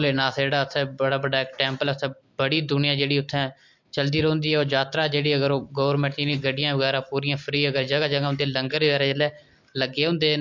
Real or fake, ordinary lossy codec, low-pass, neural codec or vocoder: real; MP3, 48 kbps; 7.2 kHz; none